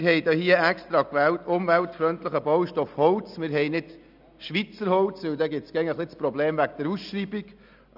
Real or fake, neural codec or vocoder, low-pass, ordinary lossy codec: real; none; 5.4 kHz; none